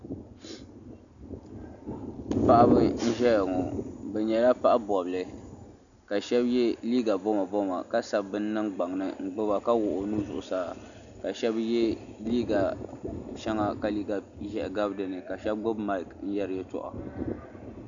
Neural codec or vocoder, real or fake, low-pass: none; real; 7.2 kHz